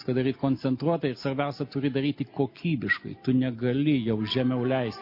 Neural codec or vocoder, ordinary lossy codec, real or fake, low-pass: none; MP3, 32 kbps; real; 5.4 kHz